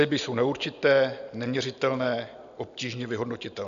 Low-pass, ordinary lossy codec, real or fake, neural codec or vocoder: 7.2 kHz; AAC, 96 kbps; real; none